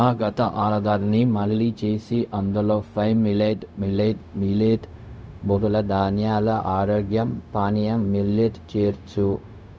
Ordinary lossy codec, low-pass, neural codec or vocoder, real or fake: none; none; codec, 16 kHz, 0.4 kbps, LongCat-Audio-Codec; fake